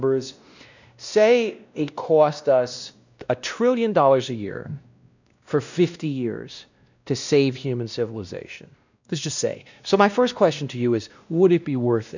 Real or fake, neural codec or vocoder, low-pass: fake; codec, 16 kHz, 1 kbps, X-Codec, WavLM features, trained on Multilingual LibriSpeech; 7.2 kHz